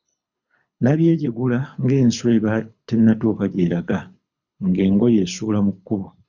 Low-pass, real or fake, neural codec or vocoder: 7.2 kHz; fake; codec, 24 kHz, 6 kbps, HILCodec